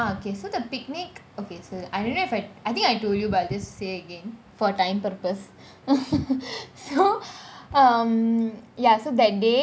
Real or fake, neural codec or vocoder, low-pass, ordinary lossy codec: real; none; none; none